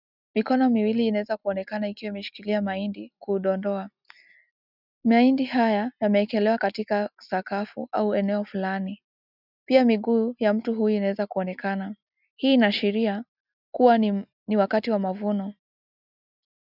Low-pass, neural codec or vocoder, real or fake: 5.4 kHz; none; real